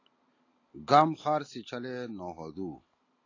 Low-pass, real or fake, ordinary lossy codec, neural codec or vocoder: 7.2 kHz; real; AAC, 32 kbps; none